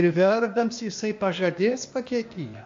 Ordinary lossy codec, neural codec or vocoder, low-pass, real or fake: AAC, 48 kbps; codec, 16 kHz, 0.8 kbps, ZipCodec; 7.2 kHz; fake